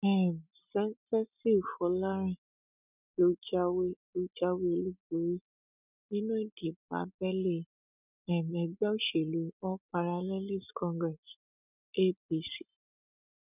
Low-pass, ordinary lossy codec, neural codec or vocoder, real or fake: 3.6 kHz; none; none; real